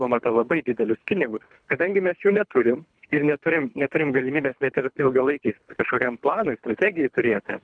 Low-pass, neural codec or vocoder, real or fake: 9.9 kHz; codec, 24 kHz, 3 kbps, HILCodec; fake